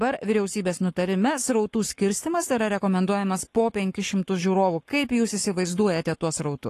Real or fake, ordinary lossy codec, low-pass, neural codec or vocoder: fake; AAC, 48 kbps; 14.4 kHz; codec, 44.1 kHz, 7.8 kbps, Pupu-Codec